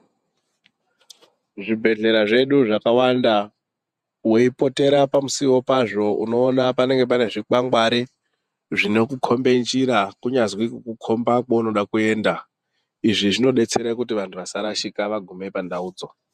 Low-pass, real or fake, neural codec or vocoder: 14.4 kHz; fake; vocoder, 48 kHz, 128 mel bands, Vocos